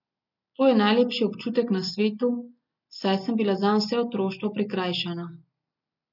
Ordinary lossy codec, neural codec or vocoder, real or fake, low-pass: none; none; real; 5.4 kHz